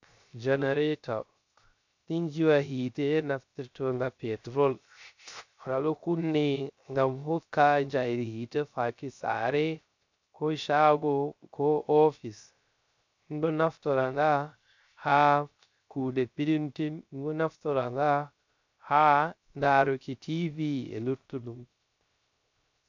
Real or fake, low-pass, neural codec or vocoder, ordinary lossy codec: fake; 7.2 kHz; codec, 16 kHz, 0.3 kbps, FocalCodec; MP3, 64 kbps